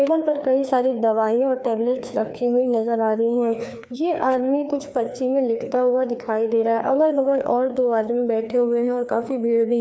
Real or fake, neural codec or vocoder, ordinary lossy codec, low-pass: fake; codec, 16 kHz, 2 kbps, FreqCodec, larger model; none; none